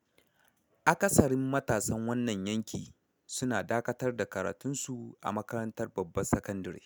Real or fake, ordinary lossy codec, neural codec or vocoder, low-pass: real; none; none; none